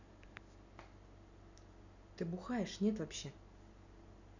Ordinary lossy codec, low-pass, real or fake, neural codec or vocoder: none; 7.2 kHz; real; none